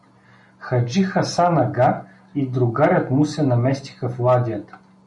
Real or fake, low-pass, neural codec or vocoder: real; 10.8 kHz; none